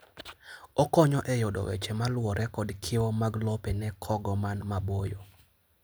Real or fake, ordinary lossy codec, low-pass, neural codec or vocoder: real; none; none; none